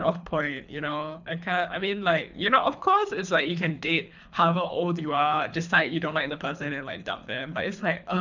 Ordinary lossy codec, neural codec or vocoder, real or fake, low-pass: none; codec, 24 kHz, 3 kbps, HILCodec; fake; 7.2 kHz